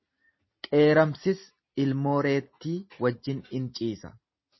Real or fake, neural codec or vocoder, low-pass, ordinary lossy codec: real; none; 7.2 kHz; MP3, 24 kbps